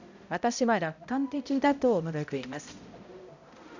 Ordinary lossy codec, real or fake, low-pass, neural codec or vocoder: none; fake; 7.2 kHz; codec, 16 kHz, 0.5 kbps, X-Codec, HuBERT features, trained on balanced general audio